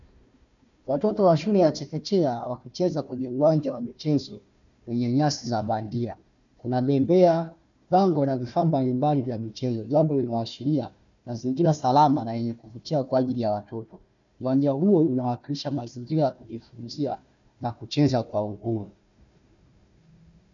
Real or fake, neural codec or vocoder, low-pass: fake; codec, 16 kHz, 1 kbps, FunCodec, trained on Chinese and English, 50 frames a second; 7.2 kHz